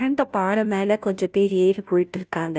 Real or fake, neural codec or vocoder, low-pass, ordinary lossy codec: fake; codec, 16 kHz, 0.5 kbps, FunCodec, trained on Chinese and English, 25 frames a second; none; none